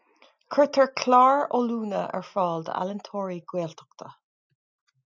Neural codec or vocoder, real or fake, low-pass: none; real; 7.2 kHz